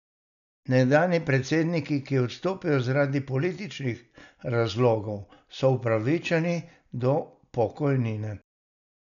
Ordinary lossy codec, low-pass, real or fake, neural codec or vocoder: none; 7.2 kHz; real; none